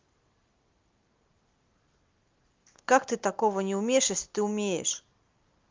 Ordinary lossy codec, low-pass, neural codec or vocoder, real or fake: Opus, 24 kbps; 7.2 kHz; none; real